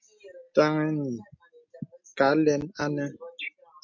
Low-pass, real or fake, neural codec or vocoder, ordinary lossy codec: 7.2 kHz; real; none; MP3, 48 kbps